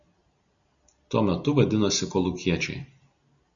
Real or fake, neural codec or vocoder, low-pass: real; none; 7.2 kHz